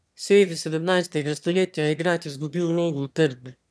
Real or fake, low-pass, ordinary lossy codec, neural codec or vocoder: fake; none; none; autoencoder, 22.05 kHz, a latent of 192 numbers a frame, VITS, trained on one speaker